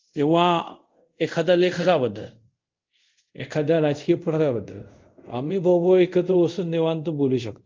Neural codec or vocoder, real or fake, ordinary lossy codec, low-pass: codec, 24 kHz, 0.5 kbps, DualCodec; fake; Opus, 24 kbps; 7.2 kHz